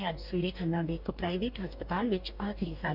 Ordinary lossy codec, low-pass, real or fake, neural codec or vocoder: none; 5.4 kHz; fake; codec, 44.1 kHz, 2.6 kbps, DAC